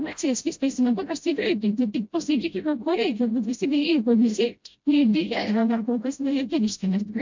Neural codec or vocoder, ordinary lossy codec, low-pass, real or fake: codec, 16 kHz, 0.5 kbps, FreqCodec, smaller model; MP3, 64 kbps; 7.2 kHz; fake